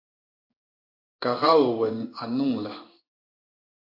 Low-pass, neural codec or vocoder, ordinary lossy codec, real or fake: 5.4 kHz; codec, 16 kHz in and 24 kHz out, 1 kbps, XY-Tokenizer; AAC, 48 kbps; fake